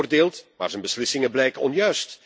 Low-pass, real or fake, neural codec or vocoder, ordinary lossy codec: none; real; none; none